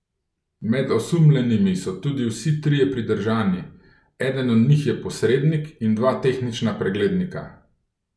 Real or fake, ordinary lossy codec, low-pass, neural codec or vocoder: real; none; none; none